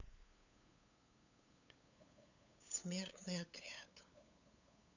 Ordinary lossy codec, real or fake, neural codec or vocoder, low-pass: none; fake; codec, 16 kHz, 8 kbps, FunCodec, trained on LibriTTS, 25 frames a second; 7.2 kHz